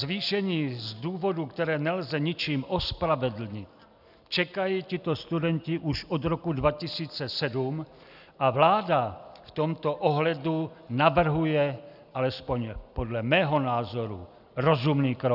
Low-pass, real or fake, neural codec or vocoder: 5.4 kHz; real; none